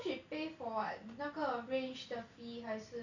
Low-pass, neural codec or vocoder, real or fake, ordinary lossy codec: 7.2 kHz; none; real; none